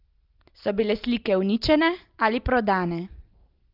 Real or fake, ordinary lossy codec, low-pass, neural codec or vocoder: real; Opus, 32 kbps; 5.4 kHz; none